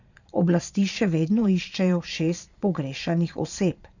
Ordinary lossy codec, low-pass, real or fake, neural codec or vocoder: none; 7.2 kHz; fake; vocoder, 22.05 kHz, 80 mel bands, Vocos